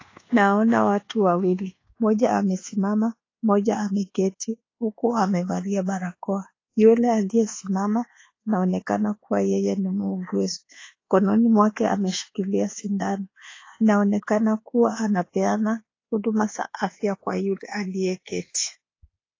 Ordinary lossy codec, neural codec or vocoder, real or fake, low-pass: AAC, 32 kbps; codec, 24 kHz, 1.2 kbps, DualCodec; fake; 7.2 kHz